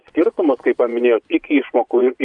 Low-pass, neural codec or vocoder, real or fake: 10.8 kHz; vocoder, 24 kHz, 100 mel bands, Vocos; fake